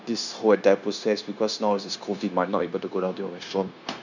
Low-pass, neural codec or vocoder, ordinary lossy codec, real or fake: 7.2 kHz; codec, 16 kHz, 0.9 kbps, LongCat-Audio-Codec; none; fake